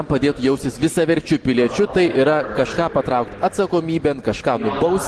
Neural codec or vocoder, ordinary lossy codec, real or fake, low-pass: none; Opus, 16 kbps; real; 10.8 kHz